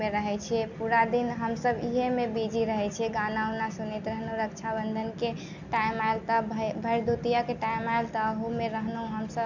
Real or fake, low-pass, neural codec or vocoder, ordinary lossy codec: real; 7.2 kHz; none; none